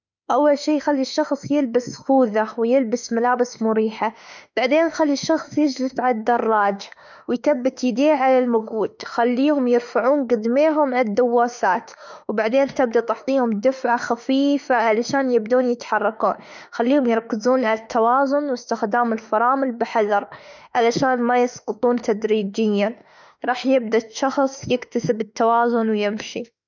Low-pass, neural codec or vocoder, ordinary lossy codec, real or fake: 7.2 kHz; autoencoder, 48 kHz, 32 numbers a frame, DAC-VAE, trained on Japanese speech; none; fake